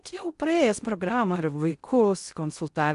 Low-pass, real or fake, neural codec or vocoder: 10.8 kHz; fake; codec, 16 kHz in and 24 kHz out, 0.6 kbps, FocalCodec, streaming, 4096 codes